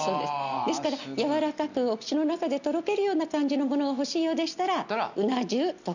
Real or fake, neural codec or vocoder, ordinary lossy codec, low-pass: real; none; none; 7.2 kHz